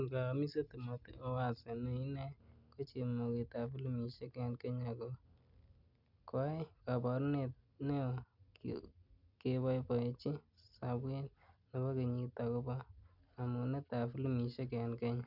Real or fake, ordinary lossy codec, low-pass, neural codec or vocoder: real; none; 5.4 kHz; none